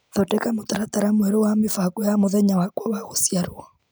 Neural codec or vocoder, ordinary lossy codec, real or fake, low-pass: none; none; real; none